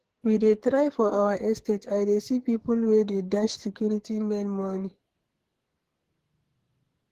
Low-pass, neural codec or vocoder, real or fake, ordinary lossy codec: 14.4 kHz; codec, 44.1 kHz, 2.6 kbps, SNAC; fake; Opus, 16 kbps